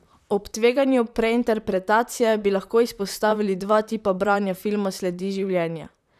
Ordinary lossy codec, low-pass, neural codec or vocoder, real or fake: none; 14.4 kHz; vocoder, 44.1 kHz, 128 mel bands, Pupu-Vocoder; fake